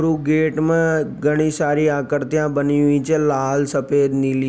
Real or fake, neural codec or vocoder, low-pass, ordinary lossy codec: real; none; none; none